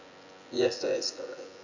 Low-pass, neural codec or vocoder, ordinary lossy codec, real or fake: 7.2 kHz; vocoder, 24 kHz, 100 mel bands, Vocos; none; fake